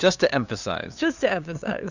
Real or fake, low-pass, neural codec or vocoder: fake; 7.2 kHz; codec, 16 kHz, 2 kbps, FunCodec, trained on LibriTTS, 25 frames a second